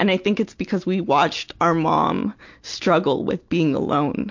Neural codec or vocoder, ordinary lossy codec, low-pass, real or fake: none; MP3, 48 kbps; 7.2 kHz; real